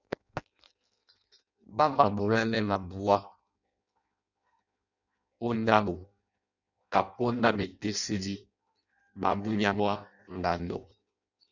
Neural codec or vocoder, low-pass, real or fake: codec, 16 kHz in and 24 kHz out, 0.6 kbps, FireRedTTS-2 codec; 7.2 kHz; fake